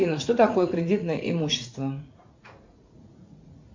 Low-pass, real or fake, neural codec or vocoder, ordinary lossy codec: 7.2 kHz; fake; vocoder, 44.1 kHz, 80 mel bands, Vocos; MP3, 48 kbps